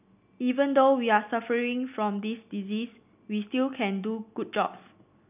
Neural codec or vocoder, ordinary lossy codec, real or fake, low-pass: none; none; real; 3.6 kHz